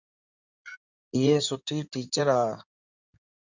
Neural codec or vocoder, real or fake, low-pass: codec, 16 kHz in and 24 kHz out, 2.2 kbps, FireRedTTS-2 codec; fake; 7.2 kHz